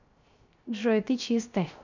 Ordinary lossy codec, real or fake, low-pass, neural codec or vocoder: none; fake; 7.2 kHz; codec, 16 kHz, 0.3 kbps, FocalCodec